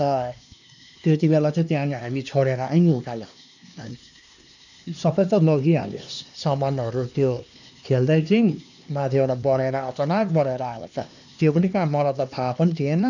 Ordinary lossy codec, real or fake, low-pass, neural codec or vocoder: none; fake; 7.2 kHz; codec, 16 kHz, 2 kbps, X-Codec, HuBERT features, trained on LibriSpeech